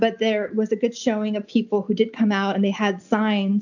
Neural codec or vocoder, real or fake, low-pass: none; real; 7.2 kHz